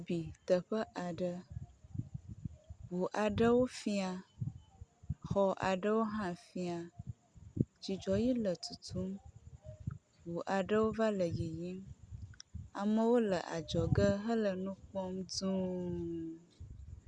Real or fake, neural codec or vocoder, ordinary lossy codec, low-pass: real; none; Opus, 64 kbps; 9.9 kHz